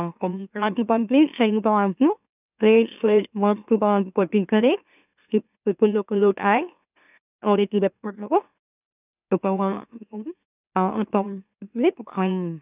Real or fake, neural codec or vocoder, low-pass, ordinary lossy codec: fake; autoencoder, 44.1 kHz, a latent of 192 numbers a frame, MeloTTS; 3.6 kHz; none